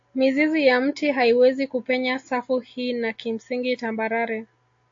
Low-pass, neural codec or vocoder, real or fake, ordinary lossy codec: 7.2 kHz; none; real; AAC, 48 kbps